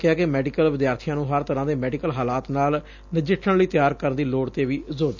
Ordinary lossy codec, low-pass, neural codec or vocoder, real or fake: none; 7.2 kHz; none; real